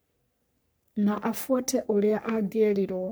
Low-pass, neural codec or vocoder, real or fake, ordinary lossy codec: none; codec, 44.1 kHz, 3.4 kbps, Pupu-Codec; fake; none